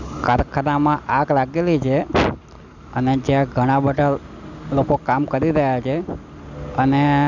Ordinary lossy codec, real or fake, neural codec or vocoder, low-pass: none; real; none; 7.2 kHz